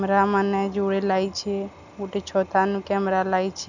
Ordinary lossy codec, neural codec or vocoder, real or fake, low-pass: none; none; real; 7.2 kHz